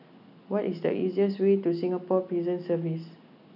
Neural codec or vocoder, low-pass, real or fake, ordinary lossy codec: none; 5.4 kHz; real; none